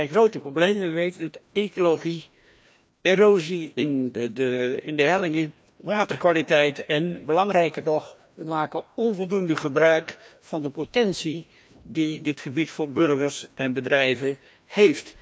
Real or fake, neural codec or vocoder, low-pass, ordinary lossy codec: fake; codec, 16 kHz, 1 kbps, FreqCodec, larger model; none; none